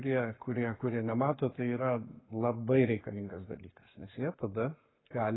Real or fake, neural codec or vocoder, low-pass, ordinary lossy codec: fake; codec, 44.1 kHz, 7.8 kbps, Pupu-Codec; 7.2 kHz; AAC, 16 kbps